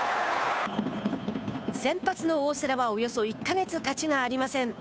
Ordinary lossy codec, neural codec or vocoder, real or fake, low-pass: none; codec, 16 kHz, 2 kbps, FunCodec, trained on Chinese and English, 25 frames a second; fake; none